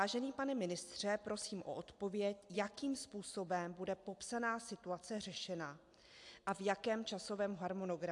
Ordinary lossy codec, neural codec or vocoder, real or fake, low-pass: AAC, 64 kbps; none; real; 10.8 kHz